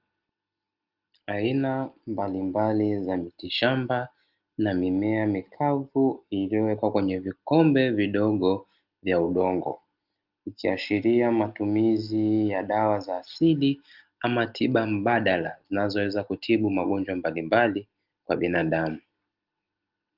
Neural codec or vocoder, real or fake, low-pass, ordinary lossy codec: none; real; 5.4 kHz; Opus, 32 kbps